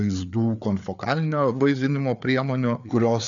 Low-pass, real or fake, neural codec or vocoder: 7.2 kHz; fake; codec, 16 kHz, 4 kbps, FreqCodec, larger model